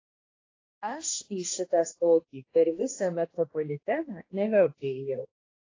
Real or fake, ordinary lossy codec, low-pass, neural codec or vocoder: fake; AAC, 32 kbps; 7.2 kHz; codec, 16 kHz, 1 kbps, X-Codec, HuBERT features, trained on balanced general audio